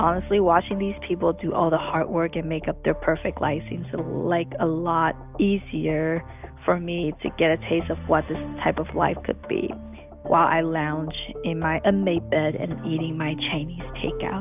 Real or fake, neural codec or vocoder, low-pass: real; none; 3.6 kHz